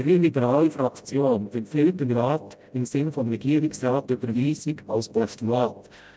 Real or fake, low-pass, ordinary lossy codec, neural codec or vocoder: fake; none; none; codec, 16 kHz, 0.5 kbps, FreqCodec, smaller model